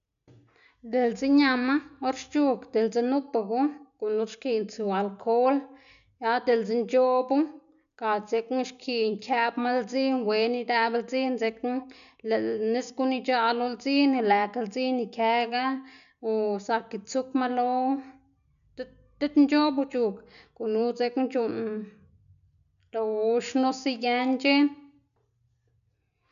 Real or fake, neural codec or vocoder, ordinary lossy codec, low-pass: real; none; none; 7.2 kHz